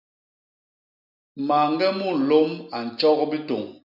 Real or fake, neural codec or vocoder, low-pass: real; none; 5.4 kHz